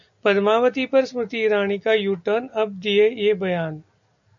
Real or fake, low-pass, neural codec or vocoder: real; 7.2 kHz; none